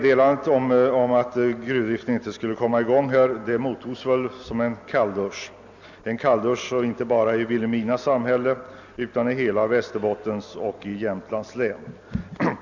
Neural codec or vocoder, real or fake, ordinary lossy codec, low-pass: none; real; none; 7.2 kHz